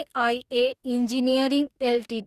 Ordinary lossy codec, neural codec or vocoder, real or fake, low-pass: Opus, 64 kbps; codec, 44.1 kHz, 2.6 kbps, DAC; fake; 14.4 kHz